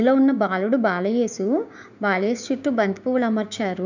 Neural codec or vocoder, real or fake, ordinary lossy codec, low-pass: codec, 16 kHz, 6 kbps, DAC; fake; none; 7.2 kHz